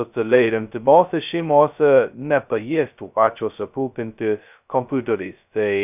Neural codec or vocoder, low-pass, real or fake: codec, 16 kHz, 0.2 kbps, FocalCodec; 3.6 kHz; fake